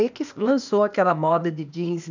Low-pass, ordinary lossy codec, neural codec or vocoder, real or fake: 7.2 kHz; none; codec, 16 kHz, 0.8 kbps, ZipCodec; fake